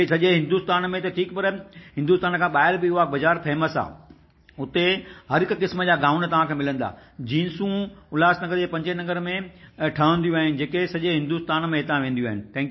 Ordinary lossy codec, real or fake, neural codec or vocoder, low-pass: MP3, 24 kbps; real; none; 7.2 kHz